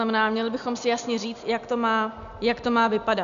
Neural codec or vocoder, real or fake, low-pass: none; real; 7.2 kHz